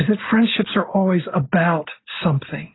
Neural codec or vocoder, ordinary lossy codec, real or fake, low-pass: none; AAC, 16 kbps; real; 7.2 kHz